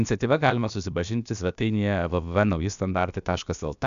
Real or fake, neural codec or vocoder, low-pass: fake; codec, 16 kHz, about 1 kbps, DyCAST, with the encoder's durations; 7.2 kHz